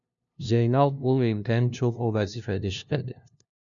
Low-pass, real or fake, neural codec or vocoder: 7.2 kHz; fake; codec, 16 kHz, 0.5 kbps, FunCodec, trained on LibriTTS, 25 frames a second